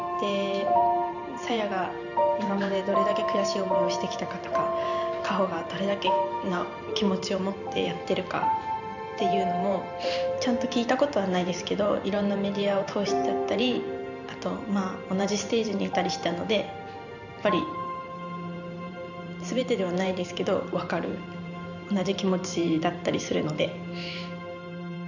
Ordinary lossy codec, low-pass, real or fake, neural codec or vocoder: none; 7.2 kHz; fake; vocoder, 44.1 kHz, 128 mel bands every 512 samples, BigVGAN v2